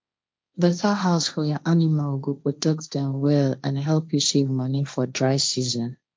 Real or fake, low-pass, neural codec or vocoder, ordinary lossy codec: fake; none; codec, 16 kHz, 1.1 kbps, Voila-Tokenizer; none